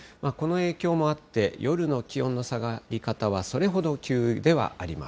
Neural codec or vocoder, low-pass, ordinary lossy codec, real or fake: none; none; none; real